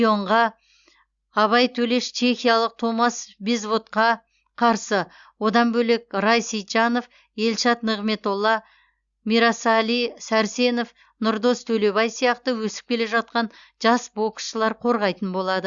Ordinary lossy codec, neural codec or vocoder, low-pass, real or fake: Opus, 64 kbps; none; 7.2 kHz; real